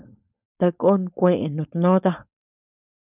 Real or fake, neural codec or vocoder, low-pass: fake; codec, 16 kHz, 4.8 kbps, FACodec; 3.6 kHz